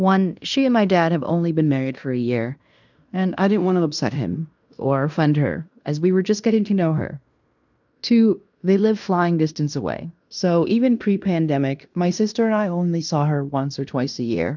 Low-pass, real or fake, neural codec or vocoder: 7.2 kHz; fake; codec, 16 kHz in and 24 kHz out, 0.9 kbps, LongCat-Audio-Codec, fine tuned four codebook decoder